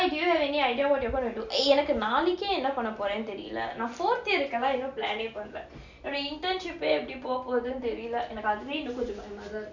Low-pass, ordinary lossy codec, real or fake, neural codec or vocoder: 7.2 kHz; none; real; none